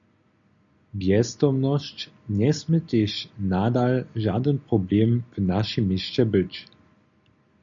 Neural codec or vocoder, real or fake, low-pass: none; real; 7.2 kHz